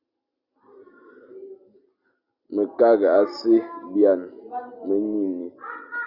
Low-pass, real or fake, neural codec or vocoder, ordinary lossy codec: 5.4 kHz; real; none; Opus, 64 kbps